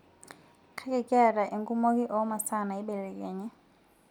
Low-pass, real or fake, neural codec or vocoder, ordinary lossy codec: 19.8 kHz; real; none; none